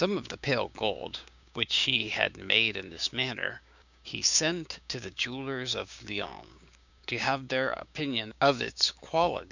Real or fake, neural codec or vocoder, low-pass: fake; codec, 16 kHz, 6 kbps, DAC; 7.2 kHz